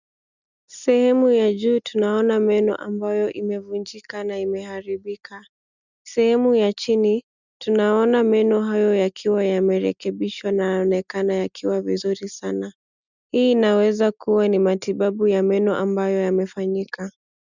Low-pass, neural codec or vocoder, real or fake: 7.2 kHz; none; real